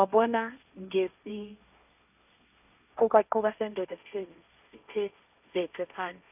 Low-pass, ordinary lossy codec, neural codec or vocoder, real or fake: 3.6 kHz; none; codec, 16 kHz, 1.1 kbps, Voila-Tokenizer; fake